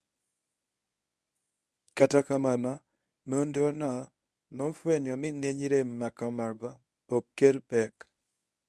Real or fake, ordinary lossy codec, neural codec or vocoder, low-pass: fake; none; codec, 24 kHz, 0.9 kbps, WavTokenizer, medium speech release version 1; none